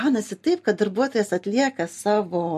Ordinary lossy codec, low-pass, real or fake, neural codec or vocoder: MP3, 64 kbps; 14.4 kHz; real; none